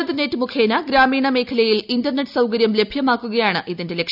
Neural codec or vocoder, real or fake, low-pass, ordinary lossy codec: none; real; 5.4 kHz; none